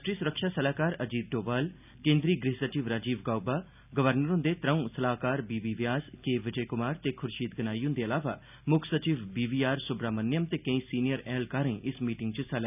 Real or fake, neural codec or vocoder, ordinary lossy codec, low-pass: real; none; none; 3.6 kHz